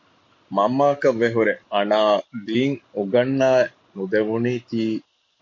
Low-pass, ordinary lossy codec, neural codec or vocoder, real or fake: 7.2 kHz; MP3, 48 kbps; none; real